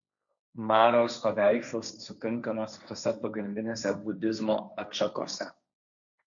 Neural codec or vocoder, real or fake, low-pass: codec, 16 kHz, 1.1 kbps, Voila-Tokenizer; fake; 7.2 kHz